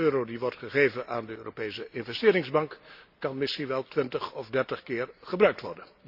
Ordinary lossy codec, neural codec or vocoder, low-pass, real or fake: Opus, 64 kbps; none; 5.4 kHz; real